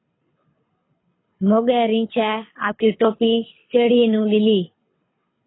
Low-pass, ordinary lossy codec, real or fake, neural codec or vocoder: 7.2 kHz; AAC, 16 kbps; fake; codec, 24 kHz, 6 kbps, HILCodec